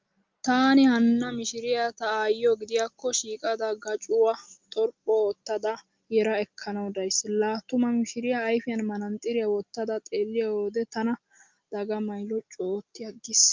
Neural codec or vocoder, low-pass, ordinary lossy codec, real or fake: none; 7.2 kHz; Opus, 24 kbps; real